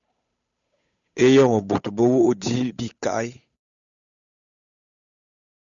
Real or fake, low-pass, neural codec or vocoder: fake; 7.2 kHz; codec, 16 kHz, 8 kbps, FunCodec, trained on Chinese and English, 25 frames a second